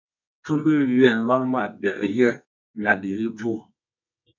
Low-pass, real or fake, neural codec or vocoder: 7.2 kHz; fake; codec, 24 kHz, 0.9 kbps, WavTokenizer, medium music audio release